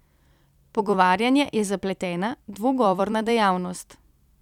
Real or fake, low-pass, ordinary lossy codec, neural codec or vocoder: fake; 19.8 kHz; none; vocoder, 44.1 kHz, 128 mel bands every 256 samples, BigVGAN v2